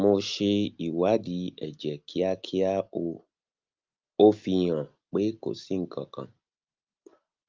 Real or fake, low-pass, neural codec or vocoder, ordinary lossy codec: real; 7.2 kHz; none; Opus, 32 kbps